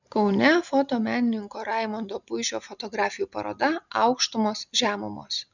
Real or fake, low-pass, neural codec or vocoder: real; 7.2 kHz; none